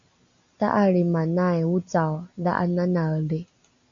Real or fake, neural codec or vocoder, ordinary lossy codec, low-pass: real; none; AAC, 64 kbps; 7.2 kHz